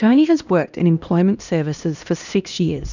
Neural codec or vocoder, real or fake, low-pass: codec, 16 kHz, 1 kbps, X-Codec, HuBERT features, trained on LibriSpeech; fake; 7.2 kHz